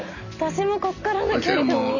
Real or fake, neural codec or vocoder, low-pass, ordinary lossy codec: real; none; 7.2 kHz; none